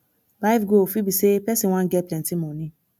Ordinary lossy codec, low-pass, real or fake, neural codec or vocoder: none; none; real; none